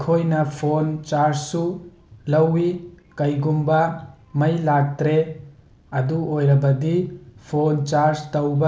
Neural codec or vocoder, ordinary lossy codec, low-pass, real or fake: none; none; none; real